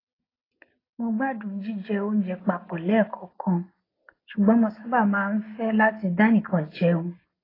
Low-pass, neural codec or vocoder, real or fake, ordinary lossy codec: 5.4 kHz; none; real; AAC, 24 kbps